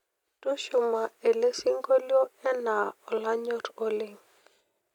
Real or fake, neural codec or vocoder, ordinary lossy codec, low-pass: real; none; none; 19.8 kHz